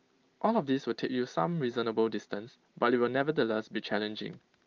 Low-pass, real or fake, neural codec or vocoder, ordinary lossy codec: 7.2 kHz; fake; vocoder, 44.1 kHz, 128 mel bands every 512 samples, BigVGAN v2; Opus, 32 kbps